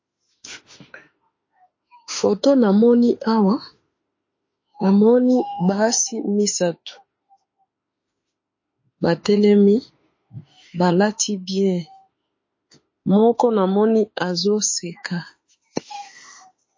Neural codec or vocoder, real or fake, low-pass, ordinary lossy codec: autoencoder, 48 kHz, 32 numbers a frame, DAC-VAE, trained on Japanese speech; fake; 7.2 kHz; MP3, 32 kbps